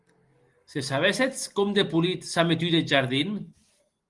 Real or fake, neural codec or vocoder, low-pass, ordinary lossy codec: real; none; 10.8 kHz; Opus, 24 kbps